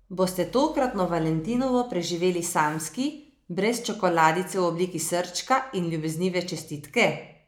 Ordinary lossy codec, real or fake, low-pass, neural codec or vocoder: none; real; none; none